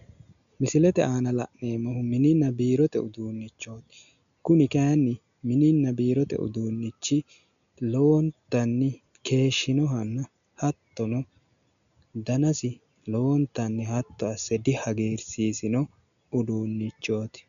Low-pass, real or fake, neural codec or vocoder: 7.2 kHz; real; none